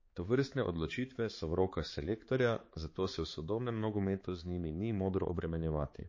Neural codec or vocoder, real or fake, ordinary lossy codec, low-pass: codec, 16 kHz, 4 kbps, X-Codec, HuBERT features, trained on balanced general audio; fake; MP3, 32 kbps; 7.2 kHz